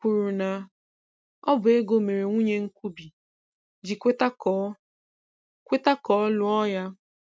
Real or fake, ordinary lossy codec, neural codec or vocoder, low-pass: real; none; none; none